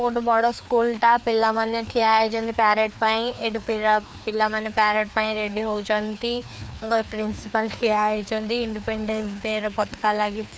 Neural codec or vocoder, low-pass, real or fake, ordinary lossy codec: codec, 16 kHz, 2 kbps, FreqCodec, larger model; none; fake; none